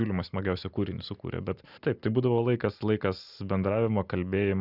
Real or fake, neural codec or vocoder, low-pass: real; none; 5.4 kHz